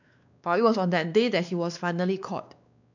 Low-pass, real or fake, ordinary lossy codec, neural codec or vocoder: 7.2 kHz; fake; none; codec, 16 kHz, 2 kbps, X-Codec, WavLM features, trained on Multilingual LibriSpeech